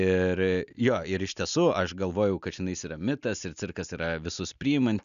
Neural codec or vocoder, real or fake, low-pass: none; real; 7.2 kHz